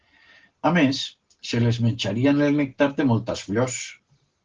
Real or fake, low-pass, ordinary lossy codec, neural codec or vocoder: real; 7.2 kHz; Opus, 16 kbps; none